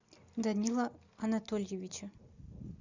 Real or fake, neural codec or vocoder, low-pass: real; none; 7.2 kHz